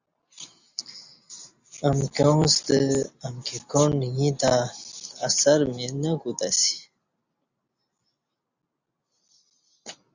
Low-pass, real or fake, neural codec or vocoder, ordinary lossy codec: 7.2 kHz; real; none; Opus, 64 kbps